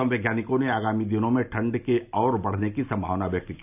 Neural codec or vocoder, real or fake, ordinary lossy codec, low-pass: none; real; none; 3.6 kHz